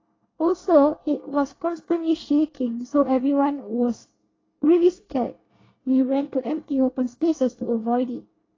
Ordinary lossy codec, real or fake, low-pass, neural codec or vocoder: AAC, 32 kbps; fake; 7.2 kHz; codec, 24 kHz, 1 kbps, SNAC